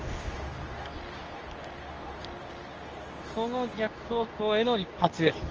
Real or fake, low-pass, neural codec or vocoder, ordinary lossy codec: fake; 7.2 kHz; codec, 24 kHz, 0.9 kbps, WavTokenizer, medium speech release version 2; Opus, 24 kbps